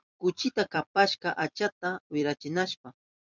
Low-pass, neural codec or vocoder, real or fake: 7.2 kHz; vocoder, 44.1 kHz, 128 mel bands every 256 samples, BigVGAN v2; fake